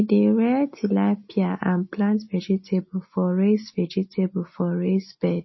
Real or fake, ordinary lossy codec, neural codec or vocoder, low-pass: real; MP3, 24 kbps; none; 7.2 kHz